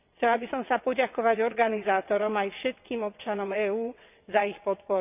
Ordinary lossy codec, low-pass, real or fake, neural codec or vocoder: none; 3.6 kHz; fake; vocoder, 22.05 kHz, 80 mel bands, WaveNeXt